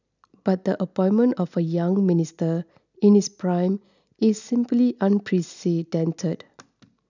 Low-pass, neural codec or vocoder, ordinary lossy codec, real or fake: 7.2 kHz; none; none; real